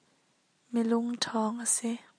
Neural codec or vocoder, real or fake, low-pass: none; real; 9.9 kHz